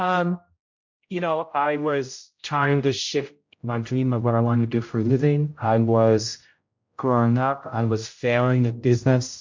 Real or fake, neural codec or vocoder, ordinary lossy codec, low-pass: fake; codec, 16 kHz, 0.5 kbps, X-Codec, HuBERT features, trained on general audio; MP3, 48 kbps; 7.2 kHz